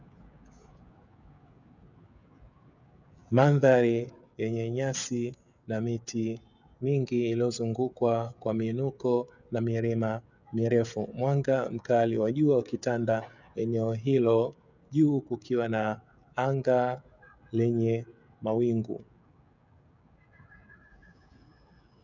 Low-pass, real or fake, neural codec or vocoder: 7.2 kHz; fake; codec, 16 kHz, 16 kbps, FreqCodec, smaller model